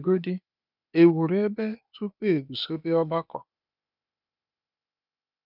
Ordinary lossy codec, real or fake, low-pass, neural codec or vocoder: none; fake; 5.4 kHz; codec, 16 kHz, 0.8 kbps, ZipCodec